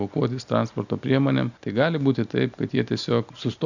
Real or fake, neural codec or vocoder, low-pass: real; none; 7.2 kHz